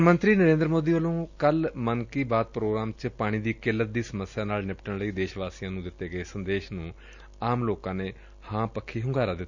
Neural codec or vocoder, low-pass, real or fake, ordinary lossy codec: none; 7.2 kHz; real; none